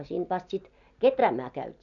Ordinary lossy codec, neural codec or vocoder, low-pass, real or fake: none; none; 7.2 kHz; real